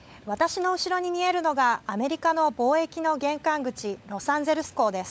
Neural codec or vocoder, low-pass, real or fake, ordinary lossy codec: codec, 16 kHz, 16 kbps, FunCodec, trained on LibriTTS, 50 frames a second; none; fake; none